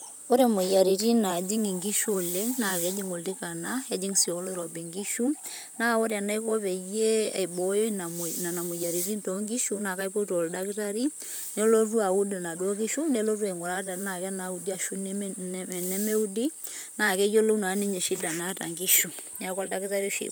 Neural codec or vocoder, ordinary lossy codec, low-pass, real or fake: vocoder, 44.1 kHz, 128 mel bands, Pupu-Vocoder; none; none; fake